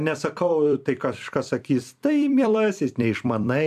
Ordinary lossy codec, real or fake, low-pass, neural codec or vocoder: AAC, 96 kbps; fake; 14.4 kHz; vocoder, 44.1 kHz, 128 mel bands every 256 samples, BigVGAN v2